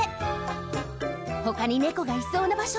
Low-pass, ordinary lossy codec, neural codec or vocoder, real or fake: none; none; none; real